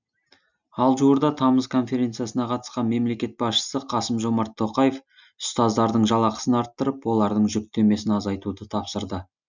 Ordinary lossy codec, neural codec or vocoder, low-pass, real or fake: none; none; 7.2 kHz; real